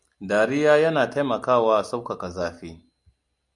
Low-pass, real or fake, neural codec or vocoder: 10.8 kHz; real; none